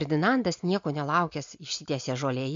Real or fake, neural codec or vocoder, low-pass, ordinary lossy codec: real; none; 7.2 kHz; MP3, 48 kbps